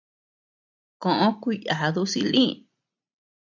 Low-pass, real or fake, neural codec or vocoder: 7.2 kHz; real; none